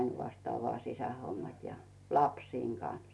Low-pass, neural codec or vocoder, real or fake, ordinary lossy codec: none; none; real; none